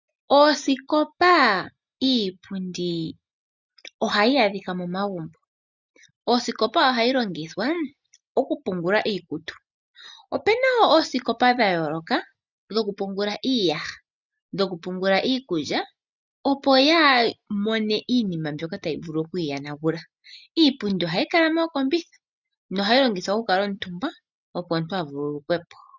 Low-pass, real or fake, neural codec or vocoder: 7.2 kHz; real; none